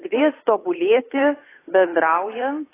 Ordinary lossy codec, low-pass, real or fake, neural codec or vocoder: AAC, 16 kbps; 3.6 kHz; fake; codec, 16 kHz, 8 kbps, FunCodec, trained on Chinese and English, 25 frames a second